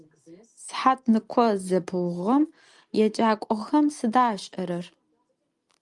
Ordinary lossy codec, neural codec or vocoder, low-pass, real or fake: Opus, 32 kbps; none; 10.8 kHz; real